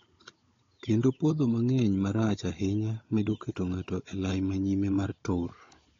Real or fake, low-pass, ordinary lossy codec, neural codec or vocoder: fake; 7.2 kHz; AAC, 32 kbps; codec, 16 kHz, 16 kbps, FunCodec, trained on Chinese and English, 50 frames a second